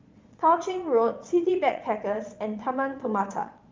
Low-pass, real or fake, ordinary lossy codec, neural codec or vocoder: 7.2 kHz; fake; Opus, 32 kbps; vocoder, 22.05 kHz, 80 mel bands, Vocos